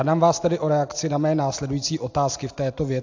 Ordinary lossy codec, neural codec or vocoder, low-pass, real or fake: AAC, 48 kbps; none; 7.2 kHz; real